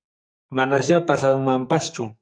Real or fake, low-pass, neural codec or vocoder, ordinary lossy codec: fake; 9.9 kHz; codec, 44.1 kHz, 2.6 kbps, SNAC; MP3, 96 kbps